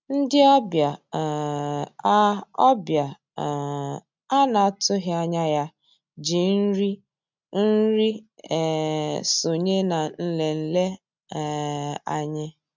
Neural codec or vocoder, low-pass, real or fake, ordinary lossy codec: none; 7.2 kHz; real; MP3, 64 kbps